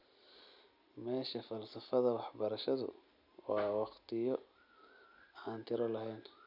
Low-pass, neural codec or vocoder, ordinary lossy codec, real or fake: 5.4 kHz; none; none; real